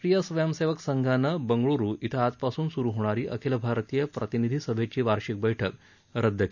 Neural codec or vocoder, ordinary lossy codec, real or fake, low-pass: none; none; real; 7.2 kHz